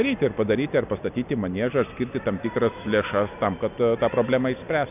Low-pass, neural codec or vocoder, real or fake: 3.6 kHz; none; real